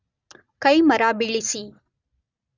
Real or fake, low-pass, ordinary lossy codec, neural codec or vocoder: real; 7.2 kHz; none; none